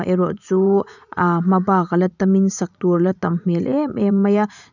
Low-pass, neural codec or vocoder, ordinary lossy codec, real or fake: 7.2 kHz; vocoder, 44.1 kHz, 80 mel bands, Vocos; none; fake